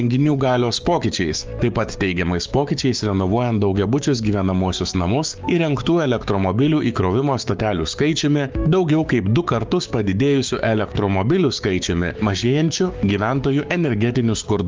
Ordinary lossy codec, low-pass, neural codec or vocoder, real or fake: Opus, 24 kbps; 7.2 kHz; codec, 16 kHz, 6 kbps, DAC; fake